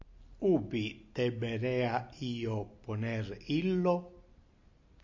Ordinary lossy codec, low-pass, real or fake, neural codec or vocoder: MP3, 48 kbps; 7.2 kHz; real; none